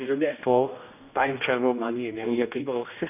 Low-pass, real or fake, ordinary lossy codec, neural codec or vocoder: 3.6 kHz; fake; none; codec, 16 kHz, 1 kbps, X-Codec, HuBERT features, trained on general audio